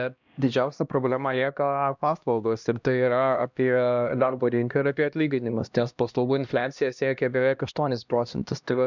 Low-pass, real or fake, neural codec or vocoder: 7.2 kHz; fake; codec, 16 kHz, 1 kbps, X-Codec, HuBERT features, trained on LibriSpeech